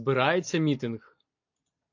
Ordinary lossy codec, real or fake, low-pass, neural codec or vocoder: AAC, 48 kbps; real; 7.2 kHz; none